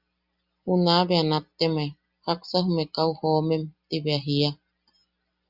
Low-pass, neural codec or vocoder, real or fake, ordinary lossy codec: 5.4 kHz; none; real; Opus, 64 kbps